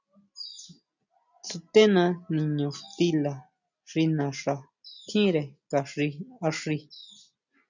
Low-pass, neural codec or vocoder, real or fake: 7.2 kHz; none; real